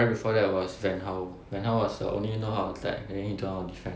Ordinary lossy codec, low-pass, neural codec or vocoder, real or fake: none; none; none; real